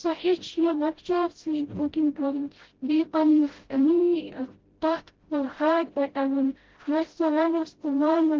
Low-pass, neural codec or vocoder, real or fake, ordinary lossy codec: 7.2 kHz; codec, 16 kHz, 0.5 kbps, FreqCodec, smaller model; fake; Opus, 16 kbps